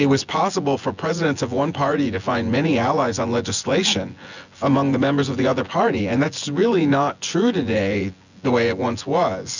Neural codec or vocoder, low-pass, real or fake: vocoder, 24 kHz, 100 mel bands, Vocos; 7.2 kHz; fake